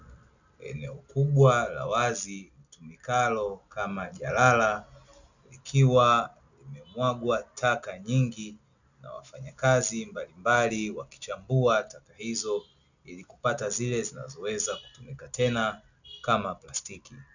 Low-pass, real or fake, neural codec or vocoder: 7.2 kHz; real; none